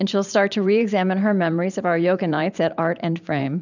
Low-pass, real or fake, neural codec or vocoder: 7.2 kHz; fake; vocoder, 44.1 kHz, 128 mel bands every 512 samples, BigVGAN v2